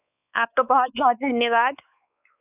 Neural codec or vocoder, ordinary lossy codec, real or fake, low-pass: codec, 16 kHz, 4 kbps, X-Codec, WavLM features, trained on Multilingual LibriSpeech; none; fake; 3.6 kHz